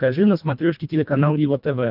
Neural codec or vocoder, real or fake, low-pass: codec, 24 kHz, 1.5 kbps, HILCodec; fake; 5.4 kHz